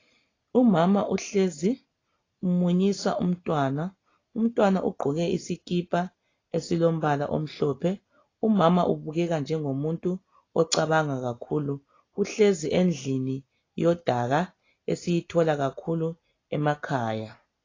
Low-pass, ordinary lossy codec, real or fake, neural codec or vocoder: 7.2 kHz; AAC, 32 kbps; real; none